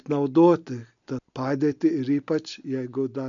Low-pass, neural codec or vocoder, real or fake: 7.2 kHz; none; real